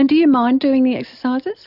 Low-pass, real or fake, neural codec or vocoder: 5.4 kHz; real; none